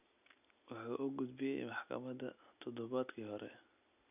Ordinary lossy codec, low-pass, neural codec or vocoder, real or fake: none; 3.6 kHz; none; real